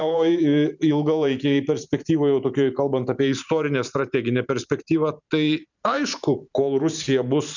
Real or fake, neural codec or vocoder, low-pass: fake; vocoder, 44.1 kHz, 128 mel bands every 512 samples, BigVGAN v2; 7.2 kHz